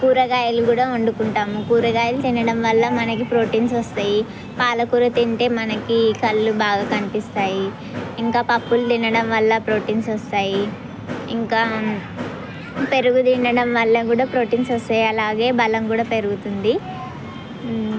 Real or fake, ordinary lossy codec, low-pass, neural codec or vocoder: real; none; none; none